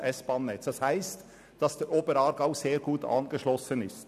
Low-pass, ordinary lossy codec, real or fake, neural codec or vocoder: 14.4 kHz; none; real; none